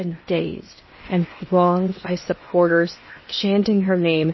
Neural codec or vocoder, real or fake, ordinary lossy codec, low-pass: codec, 16 kHz in and 24 kHz out, 0.8 kbps, FocalCodec, streaming, 65536 codes; fake; MP3, 24 kbps; 7.2 kHz